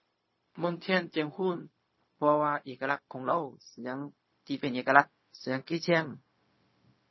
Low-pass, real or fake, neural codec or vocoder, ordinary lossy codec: 7.2 kHz; fake; codec, 16 kHz, 0.4 kbps, LongCat-Audio-Codec; MP3, 24 kbps